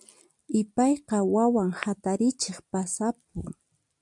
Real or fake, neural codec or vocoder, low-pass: real; none; 10.8 kHz